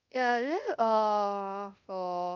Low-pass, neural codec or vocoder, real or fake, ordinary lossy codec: 7.2 kHz; codec, 24 kHz, 0.5 kbps, DualCodec; fake; none